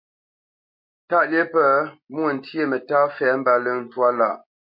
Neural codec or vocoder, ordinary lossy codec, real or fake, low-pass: none; MP3, 32 kbps; real; 5.4 kHz